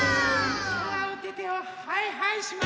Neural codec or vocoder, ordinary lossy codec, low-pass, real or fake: none; none; none; real